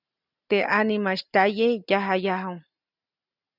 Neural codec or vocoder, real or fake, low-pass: none; real; 5.4 kHz